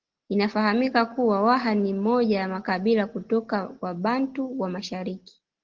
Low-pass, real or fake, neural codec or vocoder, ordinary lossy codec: 7.2 kHz; real; none; Opus, 16 kbps